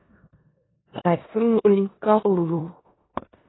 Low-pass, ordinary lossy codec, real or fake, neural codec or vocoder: 7.2 kHz; AAC, 16 kbps; fake; codec, 16 kHz in and 24 kHz out, 0.9 kbps, LongCat-Audio-Codec, four codebook decoder